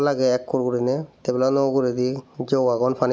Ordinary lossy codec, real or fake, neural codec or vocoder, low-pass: none; real; none; none